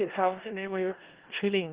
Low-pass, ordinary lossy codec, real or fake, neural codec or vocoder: 3.6 kHz; Opus, 16 kbps; fake; codec, 16 kHz in and 24 kHz out, 0.4 kbps, LongCat-Audio-Codec, four codebook decoder